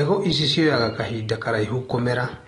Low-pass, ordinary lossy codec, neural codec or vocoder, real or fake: 10.8 kHz; AAC, 32 kbps; none; real